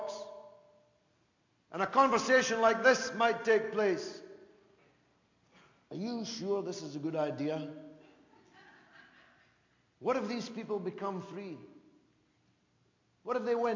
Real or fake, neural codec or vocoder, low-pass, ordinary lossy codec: real; none; 7.2 kHz; MP3, 48 kbps